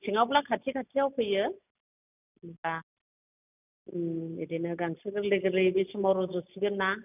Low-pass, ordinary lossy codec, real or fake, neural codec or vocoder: 3.6 kHz; none; real; none